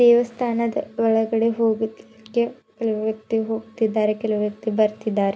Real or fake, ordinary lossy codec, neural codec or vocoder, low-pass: real; none; none; none